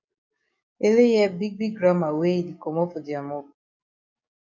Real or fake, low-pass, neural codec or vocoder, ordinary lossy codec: fake; 7.2 kHz; codec, 44.1 kHz, 7.8 kbps, DAC; MP3, 64 kbps